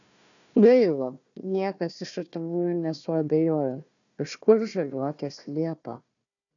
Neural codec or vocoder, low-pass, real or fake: codec, 16 kHz, 1 kbps, FunCodec, trained on Chinese and English, 50 frames a second; 7.2 kHz; fake